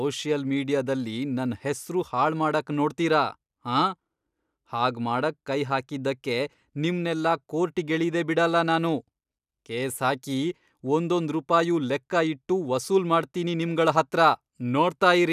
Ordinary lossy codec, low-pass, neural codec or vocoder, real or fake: none; 14.4 kHz; none; real